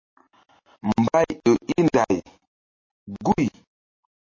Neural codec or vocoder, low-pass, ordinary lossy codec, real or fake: none; 7.2 kHz; MP3, 32 kbps; real